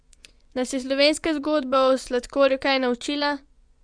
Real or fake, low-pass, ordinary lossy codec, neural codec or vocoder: fake; 9.9 kHz; none; vocoder, 24 kHz, 100 mel bands, Vocos